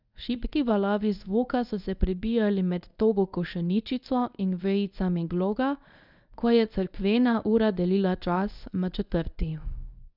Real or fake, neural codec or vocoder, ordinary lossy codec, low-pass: fake; codec, 24 kHz, 0.9 kbps, WavTokenizer, medium speech release version 1; none; 5.4 kHz